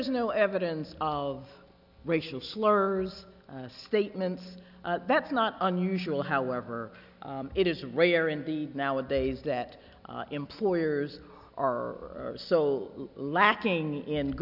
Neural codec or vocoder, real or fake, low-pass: none; real; 5.4 kHz